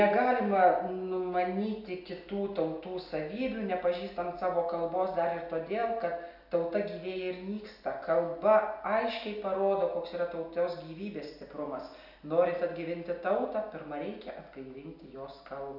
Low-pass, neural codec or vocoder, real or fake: 5.4 kHz; none; real